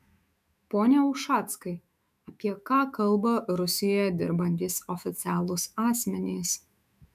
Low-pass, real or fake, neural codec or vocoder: 14.4 kHz; fake; autoencoder, 48 kHz, 128 numbers a frame, DAC-VAE, trained on Japanese speech